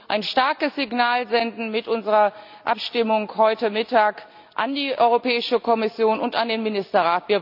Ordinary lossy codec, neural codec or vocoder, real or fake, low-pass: none; none; real; 5.4 kHz